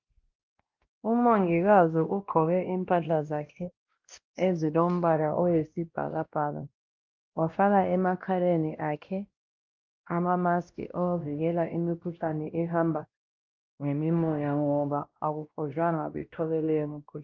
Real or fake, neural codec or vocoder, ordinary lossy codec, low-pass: fake; codec, 16 kHz, 1 kbps, X-Codec, WavLM features, trained on Multilingual LibriSpeech; Opus, 32 kbps; 7.2 kHz